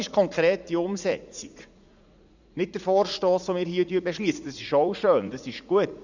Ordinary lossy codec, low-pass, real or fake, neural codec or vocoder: none; 7.2 kHz; fake; vocoder, 44.1 kHz, 80 mel bands, Vocos